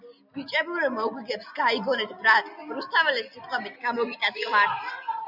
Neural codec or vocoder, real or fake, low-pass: none; real; 5.4 kHz